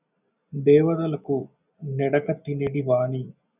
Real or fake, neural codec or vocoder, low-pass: real; none; 3.6 kHz